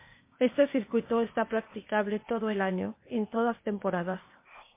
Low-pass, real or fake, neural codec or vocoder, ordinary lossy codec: 3.6 kHz; fake; codec, 16 kHz, 0.8 kbps, ZipCodec; MP3, 24 kbps